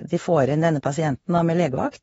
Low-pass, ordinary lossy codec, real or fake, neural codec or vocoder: 19.8 kHz; AAC, 24 kbps; fake; autoencoder, 48 kHz, 32 numbers a frame, DAC-VAE, trained on Japanese speech